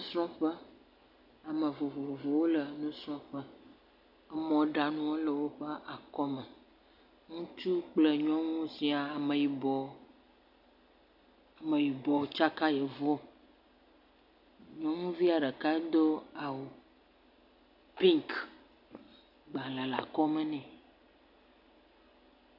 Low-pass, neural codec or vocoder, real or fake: 5.4 kHz; none; real